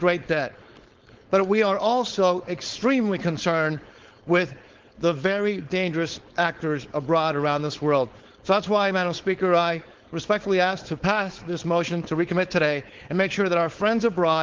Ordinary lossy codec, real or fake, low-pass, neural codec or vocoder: Opus, 16 kbps; fake; 7.2 kHz; codec, 16 kHz, 4.8 kbps, FACodec